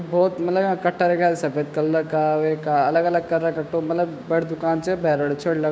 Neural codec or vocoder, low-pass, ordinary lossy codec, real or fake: codec, 16 kHz, 6 kbps, DAC; none; none; fake